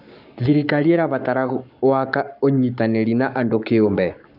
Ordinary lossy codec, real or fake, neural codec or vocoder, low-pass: none; fake; codec, 44.1 kHz, 7.8 kbps, Pupu-Codec; 5.4 kHz